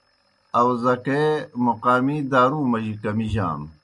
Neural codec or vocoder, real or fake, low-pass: none; real; 10.8 kHz